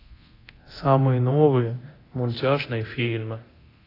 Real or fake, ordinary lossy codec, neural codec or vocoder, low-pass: fake; AAC, 24 kbps; codec, 24 kHz, 0.9 kbps, DualCodec; 5.4 kHz